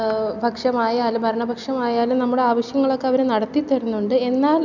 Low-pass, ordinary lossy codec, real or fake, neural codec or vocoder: 7.2 kHz; none; real; none